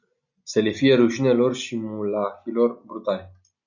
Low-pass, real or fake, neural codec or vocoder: 7.2 kHz; real; none